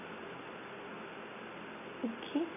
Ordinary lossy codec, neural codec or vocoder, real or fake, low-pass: none; none; real; 3.6 kHz